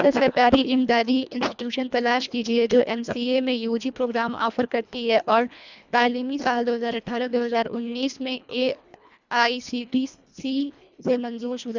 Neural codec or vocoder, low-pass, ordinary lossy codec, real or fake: codec, 24 kHz, 1.5 kbps, HILCodec; 7.2 kHz; none; fake